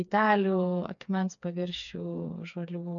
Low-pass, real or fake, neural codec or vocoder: 7.2 kHz; fake; codec, 16 kHz, 4 kbps, FreqCodec, smaller model